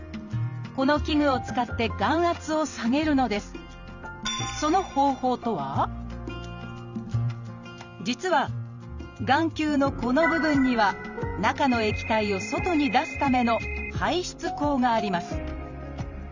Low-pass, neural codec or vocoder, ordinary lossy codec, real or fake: 7.2 kHz; vocoder, 44.1 kHz, 128 mel bands every 512 samples, BigVGAN v2; none; fake